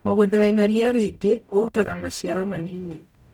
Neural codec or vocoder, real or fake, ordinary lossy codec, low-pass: codec, 44.1 kHz, 0.9 kbps, DAC; fake; none; 19.8 kHz